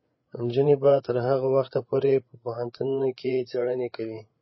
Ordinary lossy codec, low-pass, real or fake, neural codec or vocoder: MP3, 24 kbps; 7.2 kHz; fake; codec, 16 kHz, 8 kbps, FreqCodec, larger model